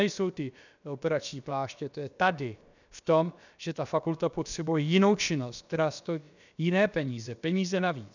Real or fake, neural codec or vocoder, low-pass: fake; codec, 16 kHz, about 1 kbps, DyCAST, with the encoder's durations; 7.2 kHz